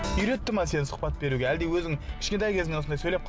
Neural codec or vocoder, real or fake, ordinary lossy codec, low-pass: none; real; none; none